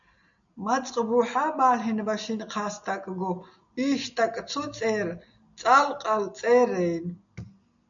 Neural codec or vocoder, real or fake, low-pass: none; real; 7.2 kHz